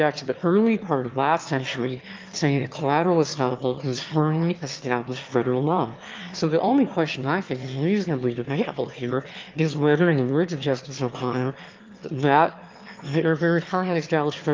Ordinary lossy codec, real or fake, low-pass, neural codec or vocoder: Opus, 32 kbps; fake; 7.2 kHz; autoencoder, 22.05 kHz, a latent of 192 numbers a frame, VITS, trained on one speaker